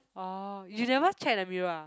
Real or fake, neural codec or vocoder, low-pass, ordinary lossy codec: real; none; none; none